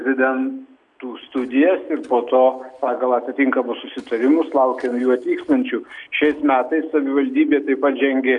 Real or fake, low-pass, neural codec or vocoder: real; 10.8 kHz; none